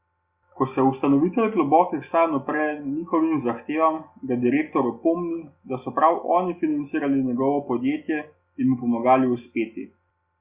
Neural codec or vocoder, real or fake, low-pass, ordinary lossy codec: none; real; 3.6 kHz; Opus, 64 kbps